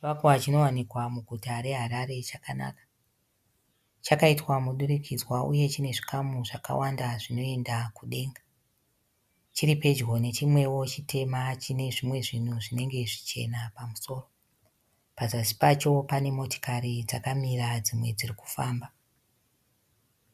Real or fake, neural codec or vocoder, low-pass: real; none; 14.4 kHz